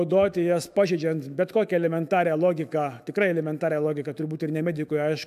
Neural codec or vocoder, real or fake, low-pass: autoencoder, 48 kHz, 128 numbers a frame, DAC-VAE, trained on Japanese speech; fake; 14.4 kHz